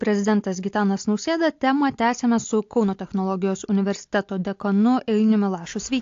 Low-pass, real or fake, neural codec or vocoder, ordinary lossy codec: 7.2 kHz; real; none; AAC, 48 kbps